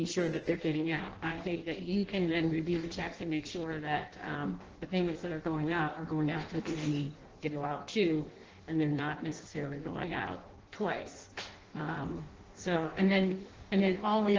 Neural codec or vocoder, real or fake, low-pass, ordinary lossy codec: codec, 16 kHz in and 24 kHz out, 0.6 kbps, FireRedTTS-2 codec; fake; 7.2 kHz; Opus, 16 kbps